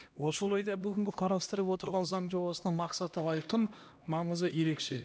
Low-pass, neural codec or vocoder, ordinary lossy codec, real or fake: none; codec, 16 kHz, 1 kbps, X-Codec, HuBERT features, trained on LibriSpeech; none; fake